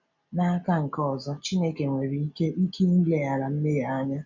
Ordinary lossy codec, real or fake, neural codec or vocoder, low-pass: none; real; none; 7.2 kHz